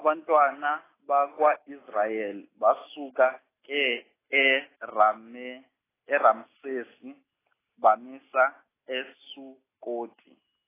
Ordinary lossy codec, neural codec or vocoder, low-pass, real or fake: AAC, 16 kbps; none; 3.6 kHz; real